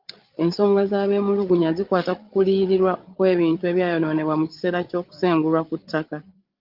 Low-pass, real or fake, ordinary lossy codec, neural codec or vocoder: 5.4 kHz; fake; Opus, 32 kbps; codec, 16 kHz, 8 kbps, FreqCodec, larger model